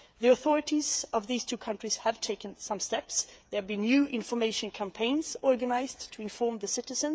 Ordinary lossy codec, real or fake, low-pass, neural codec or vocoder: none; fake; none; codec, 16 kHz, 8 kbps, FreqCodec, smaller model